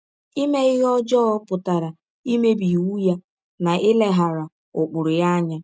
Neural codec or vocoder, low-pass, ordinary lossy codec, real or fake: none; none; none; real